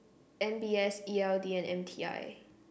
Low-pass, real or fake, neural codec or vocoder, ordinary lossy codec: none; real; none; none